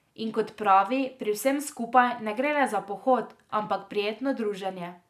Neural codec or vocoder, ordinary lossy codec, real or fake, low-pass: none; none; real; 14.4 kHz